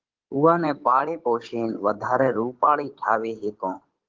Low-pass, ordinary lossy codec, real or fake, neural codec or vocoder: 7.2 kHz; Opus, 16 kbps; fake; codec, 16 kHz in and 24 kHz out, 2.2 kbps, FireRedTTS-2 codec